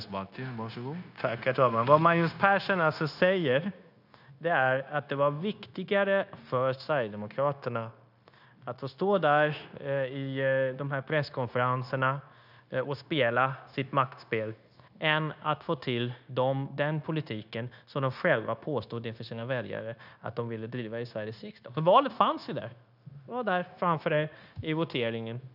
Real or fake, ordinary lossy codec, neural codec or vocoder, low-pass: fake; none; codec, 16 kHz, 0.9 kbps, LongCat-Audio-Codec; 5.4 kHz